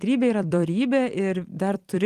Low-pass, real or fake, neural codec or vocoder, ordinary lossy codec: 14.4 kHz; fake; vocoder, 44.1 kHz, 128 mel bands every 256 samples, BigVGAN v2; Opus, 24 kbps